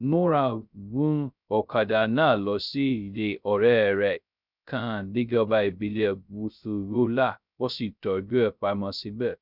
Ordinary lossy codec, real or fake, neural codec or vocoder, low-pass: none; fake; codec, 16 kHz, 0.2 kbps, FocalCodec; 5.4 kHz